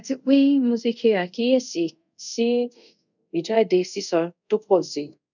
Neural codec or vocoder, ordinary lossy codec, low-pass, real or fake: codec, 24 kHz, 0.5 kbps, DualCodec; none; 7.2 kHz; fake